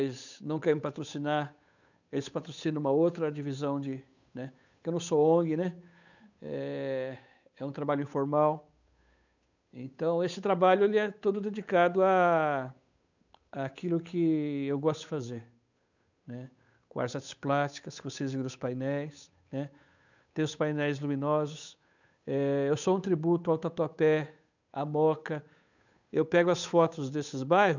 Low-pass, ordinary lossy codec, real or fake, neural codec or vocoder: 7.2 kHz; none; fake; codec, 16 kHz, 8 kbps, FunCodec, trained on Chinese and English, 25 frames a second